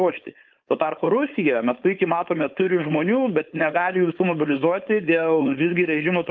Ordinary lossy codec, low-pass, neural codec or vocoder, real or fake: Opus, 32 kbps; 7.2 kHz; codec, 16 kHz, 4.8 kbps, FACodec; fake